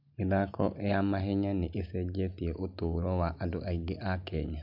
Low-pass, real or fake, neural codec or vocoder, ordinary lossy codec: 5.4 kHz; real; none; MP3, 48 kbps